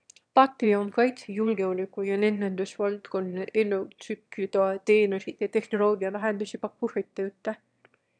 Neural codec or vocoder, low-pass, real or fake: autoencoder, 22.05 kHz, a latent of 192 numbers a frame, VITS, trained on one speaker; 9.9 kHz; fake